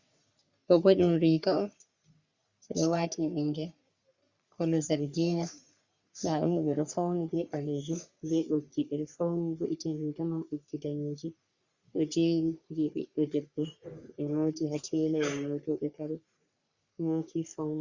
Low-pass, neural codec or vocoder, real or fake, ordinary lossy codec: 7.2 kHz; codec, 44.1 kHz, 3.4 kbps, Pupu-Codec; fake; Opus, 64 kbps